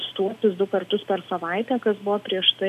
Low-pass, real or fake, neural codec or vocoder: 14.4 kHz; real; none